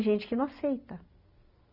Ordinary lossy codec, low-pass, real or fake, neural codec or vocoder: none; 5.4 kHz; real; none